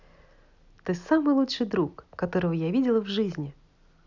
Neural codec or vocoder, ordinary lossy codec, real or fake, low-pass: none; none; real; 7.2 kHz